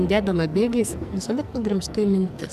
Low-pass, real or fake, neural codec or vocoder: 14.4 kHz; fake; codec, 44.1 kHz, 2.6 kbps, SNAC